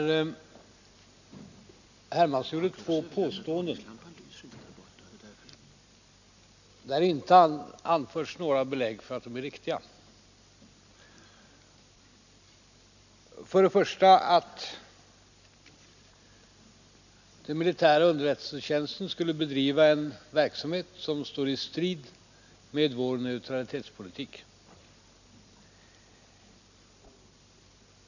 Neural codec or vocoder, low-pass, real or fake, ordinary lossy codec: none; 7.2 kHz; real; none